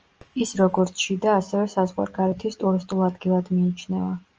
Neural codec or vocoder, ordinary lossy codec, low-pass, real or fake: none; Opus, 24 kbps; 7.2 kHz; real